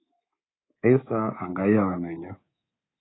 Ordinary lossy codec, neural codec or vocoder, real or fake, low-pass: AAC, 16 kbps; codec, 24 kHz, 3.1 kbps, DualCodec; fake; 7.2 kHz